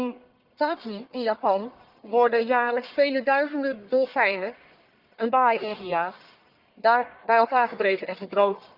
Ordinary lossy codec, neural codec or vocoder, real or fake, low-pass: Opus, 32 kbps; codec, 44.1 kHz, 1.7 kbps, Pupu-Codec; fake; 5.4 kHz